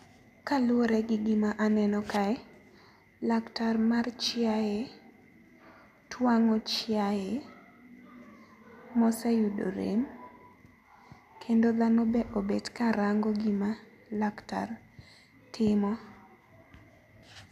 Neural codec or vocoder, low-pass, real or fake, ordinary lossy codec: none; 14.4 kHz; real; none